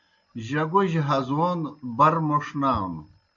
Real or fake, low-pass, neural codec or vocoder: real; 7.2 kHz; none